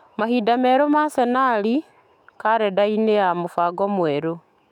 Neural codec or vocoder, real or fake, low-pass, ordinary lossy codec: autoencoder, 48 kHz, 128 numbers a frame, DAC-VAE, trained on Japanese speech; fake; 19.8 kHz; MP3, 96 kbps